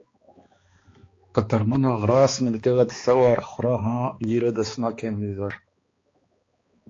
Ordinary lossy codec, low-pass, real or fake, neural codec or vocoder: AAC, 32 kbps; 7.2 kHz; fake; codec, 16 kHz, 2 kbps, X-Codec, HuBERT features, trained on balanced general audio